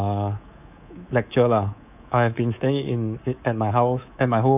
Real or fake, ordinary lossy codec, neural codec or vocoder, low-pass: fake; none; codec, 24 kHz, 3.1 kbps, DualCodec; 3.6 kHz